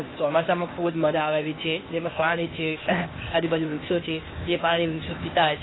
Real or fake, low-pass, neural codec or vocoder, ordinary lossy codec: fake; 7.2 kHz; codec, 16 kHz, 0.8 kbps, ZipCodec; AAC, 16 kbps